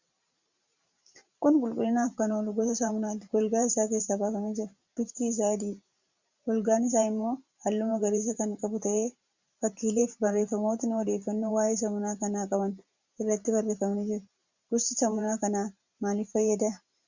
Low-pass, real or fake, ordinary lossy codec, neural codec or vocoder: 7.2 kHz; fake; Opus, 64 kbps; vocoder, 44.1 kHz, 128 mel bands every 512 samples, BigVGAN v2